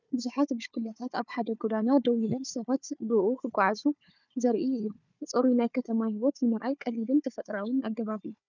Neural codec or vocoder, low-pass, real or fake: codec, 16 kHz, 4 kbps, FunCodec, trained on Chinese and English, 50 frames a second; 7.2 kHz; fake